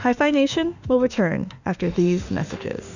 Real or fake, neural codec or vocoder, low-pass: fake; autoencoder, 48 kHz, 32 numbers a frame, DAC-VAE, trained on Japanese speech; 7.2 kHz